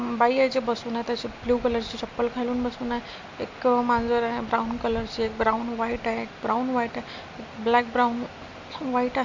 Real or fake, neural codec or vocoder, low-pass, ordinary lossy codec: real; none; 7.2 kHz; MP3, 48 kbps